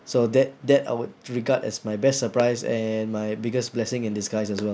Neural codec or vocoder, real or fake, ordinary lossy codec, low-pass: none; real; none; none